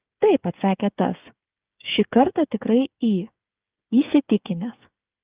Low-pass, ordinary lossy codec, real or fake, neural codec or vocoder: 3.6 kHz; Opus, 24 kbps; fake; codec, 16 kHz, 8 kbps, FreqCodec, smaller model